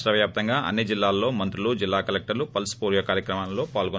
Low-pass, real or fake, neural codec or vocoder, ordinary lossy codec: none; real; none; none